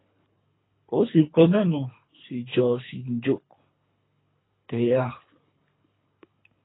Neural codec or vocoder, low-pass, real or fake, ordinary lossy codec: codec, 24 kHz, 3 kbps, HILCodec; 7.2 kHz; fake; AAC, 16 kbps